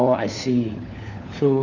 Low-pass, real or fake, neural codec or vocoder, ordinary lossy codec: 7.2 kHz; fake; codec, 16 kHz, 4 kbps, FunCodec, trained on LibriTTS, 50 frames a second; none